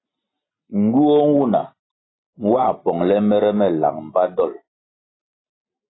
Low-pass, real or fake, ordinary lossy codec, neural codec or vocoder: 7.2 kHz; real; AAC, 16 kbps; none